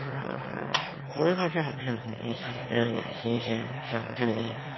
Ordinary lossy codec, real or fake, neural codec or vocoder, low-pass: MP3, 24 kbps; fake; autoencoder, 22.05 kHz, a latent of 192 numbers a frame, VITS, trained on one speaker; 7.2 kHz